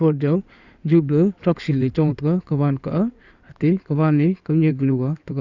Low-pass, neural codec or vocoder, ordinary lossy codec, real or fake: 7.2 kHz; codec, 16 kHz in and 24 kHz out, 2.2 kbps, FireRedTTS-2 codec; none; fake